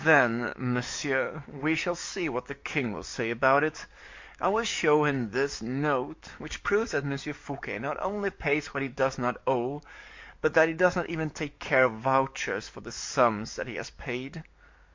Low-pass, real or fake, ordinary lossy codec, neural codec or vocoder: 7.2 kHz; fake; MP3, 48 kbps; codec, 44.1 kHz, 7.8 kbps, DAC